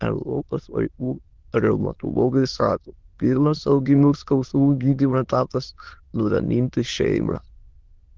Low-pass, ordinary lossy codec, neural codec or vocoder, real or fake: 7.2 kHz; Opus, 16 kbps; autoencoder, 22.05 kHz, a latent of 192 numbers a frame, VITS, trained on many speakers; fake